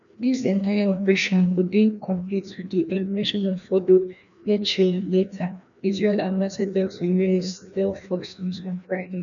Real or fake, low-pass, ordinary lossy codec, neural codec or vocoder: fake; 7.2 kHz; none; codec, 16 kHz, 1 kbps, FreqCodec, larger model